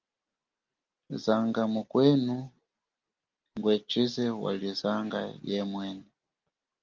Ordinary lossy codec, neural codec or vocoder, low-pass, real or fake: Opus, 32 kbps; none; 7.2 kHz; real